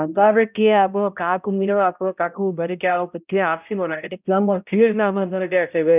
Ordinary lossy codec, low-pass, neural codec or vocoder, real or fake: none; 3.6 kHz; codec, 16 kHz, 0.5 kbps, X-Codec, HuBERT features, trained on balanced general audio; fake